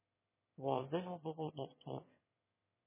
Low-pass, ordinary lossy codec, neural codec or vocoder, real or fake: 3.6 kHz; MP3, 16 kbps; autoencoder, 22.05 kHz, a latent of 192 numbers a frame, VITS, trained on one speaker; fake